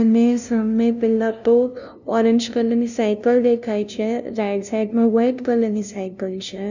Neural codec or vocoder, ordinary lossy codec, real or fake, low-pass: codec, 16 kHz, 0.5 kbps, FunCodec, trained on LibriTTS, 25 frames a second; none; fake; 7.2 kHz